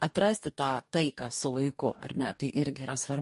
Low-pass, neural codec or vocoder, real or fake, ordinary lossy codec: 14.4 kHz; codec, 44.1 kHz, 2.6 kbps, DAC; fake; MP3, 48 kbps